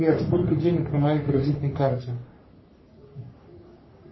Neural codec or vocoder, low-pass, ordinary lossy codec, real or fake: codec, 44.1 kHz, 3.4 kbps, Pupu-Codec; 7.2 kHz; MP3, 24 kbps; fake